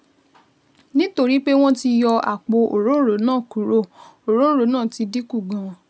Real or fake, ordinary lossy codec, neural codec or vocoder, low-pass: real; none; none; none